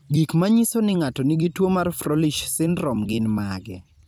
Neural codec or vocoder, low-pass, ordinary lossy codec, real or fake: vocoder, 44.1 kHz, 128 mel bands every 256 samples, BigVGAN v2; none; none; fake